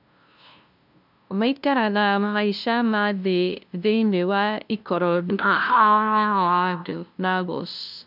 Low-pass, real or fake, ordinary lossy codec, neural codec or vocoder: 5.4 kHz; fake; none; codec, 16 kHz, 0.5 kbps, FunCodec, trained on LibriTTS, 25 frames a second